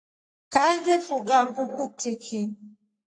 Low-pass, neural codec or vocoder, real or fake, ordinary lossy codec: 9.9 kHz; codec, 44.1 kHz, 3.4 kbps, Pupu-Codec; fake; AAC, 64 kbps